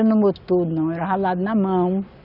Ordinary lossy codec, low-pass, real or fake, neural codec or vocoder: none; 5.4 kHz; real; none